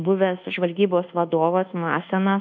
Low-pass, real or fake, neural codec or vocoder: 7.2 kHz; fake; codec, 24 kHz, 1.2 kbps, DualCodec